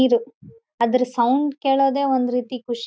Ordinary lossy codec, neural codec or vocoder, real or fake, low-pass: none; none; real; none